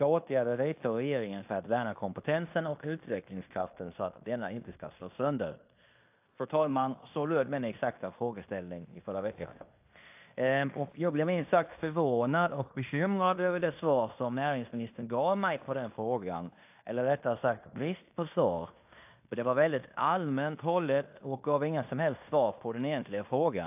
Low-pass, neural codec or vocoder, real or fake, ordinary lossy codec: 3.6 kHz; codec, 16 kHz in and 24 kHz out, 0.9 kbps, LongCat-Audio-Codec, fine tuned four codebook decoder; fake; AAC, 32 kbps